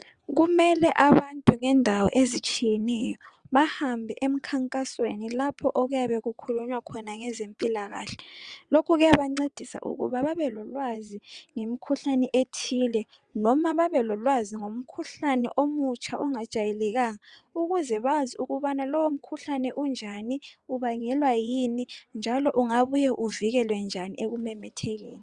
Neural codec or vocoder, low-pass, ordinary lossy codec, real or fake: vocoder, 22.05 kHz, 80 mel bands, WaveNeXt; 9.9 kHz; Opus, 64 kbps; fake